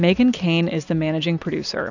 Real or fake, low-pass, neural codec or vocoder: real; 7.2 kHz; none